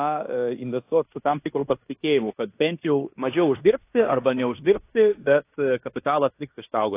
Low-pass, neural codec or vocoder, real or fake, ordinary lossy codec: 3.6 kHz; codec, 16 kHz in and 24 kHz out, 0.9 kbps, LongCat-Audio-Codec, fine tuned four codebook decoder; fake; AAC, 24 kbps